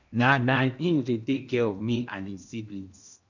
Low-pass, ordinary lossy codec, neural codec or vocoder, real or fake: 7.2 kHz; none; codec, 16 kHz in and 24 kHz out, 0.8 kbps, FocalCodec, streaming, 65536 codes; fake